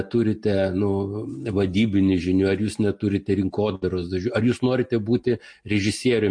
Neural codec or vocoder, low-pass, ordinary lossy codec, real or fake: none; 9.9 kHz; MP3, 48 kbps; real